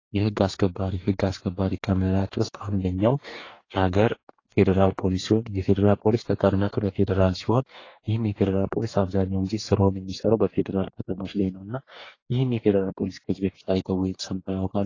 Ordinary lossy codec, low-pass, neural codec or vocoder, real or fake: AAC, 32 kbps; 7.2 kHz; codec, 44.1 kHz, 2.6 kbps, DAC; fake